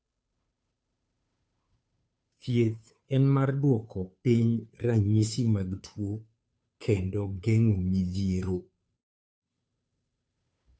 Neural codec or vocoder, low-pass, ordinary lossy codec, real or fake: codec, 16 kHz, 2 kbps, FunCodec, trained on Chinese and English, 25 frames a second; none; none; fake